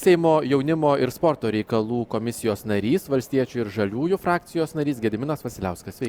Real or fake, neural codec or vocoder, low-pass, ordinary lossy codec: real; none; 19.8 kHz; Opus, 64 kbps